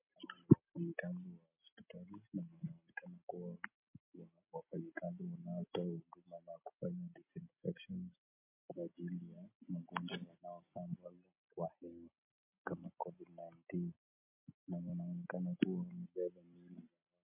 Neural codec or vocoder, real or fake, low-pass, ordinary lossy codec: none; real; 3.6 kHz; MP3, 32 kbps